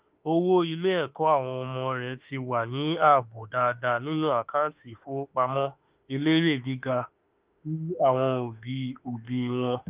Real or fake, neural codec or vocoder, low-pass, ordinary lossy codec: fake; autoencoder, 48 kHz, 32 numbers a frame, DAC-VAE, trained on Japanese speech; 3.6 kHz; Opus, 24 kbps